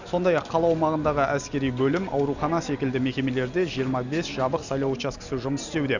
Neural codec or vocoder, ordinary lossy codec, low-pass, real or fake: none; none; 7.2 kHz; real